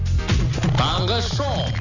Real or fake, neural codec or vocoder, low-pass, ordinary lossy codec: real; none; 7.2 kHz; none